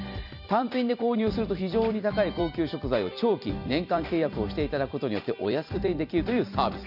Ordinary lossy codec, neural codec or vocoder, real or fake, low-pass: none; vocoder, 44.1 kHz, 128 mel bands every 256 samples, BigVGAN v2; fake; 5.4 kHz